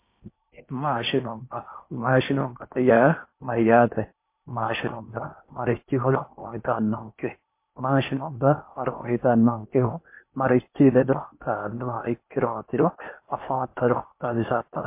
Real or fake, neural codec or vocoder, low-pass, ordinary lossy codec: fake; codec, 16 kHz in and 24 kHz out, 0.8 kbps, FocalCodec, streaming, 65536 codes; 3.6 kHz; MP3, 24 kbps